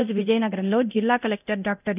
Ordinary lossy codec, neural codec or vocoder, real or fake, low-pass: none; codec, 24 kHz, 0.9 kbps, DualCodec; fake; 3.6 kHz